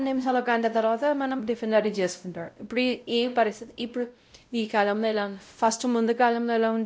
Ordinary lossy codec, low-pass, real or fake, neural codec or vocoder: none; none; fake; codec, 16 kHz, 0.5 kbps, X-Codec, WavLM features, trained on Multilingual LibriSpeech